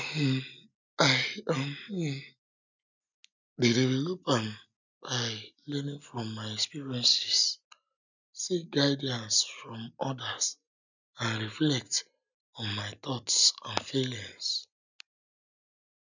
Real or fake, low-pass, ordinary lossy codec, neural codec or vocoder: real; 7.2 kHz; none; none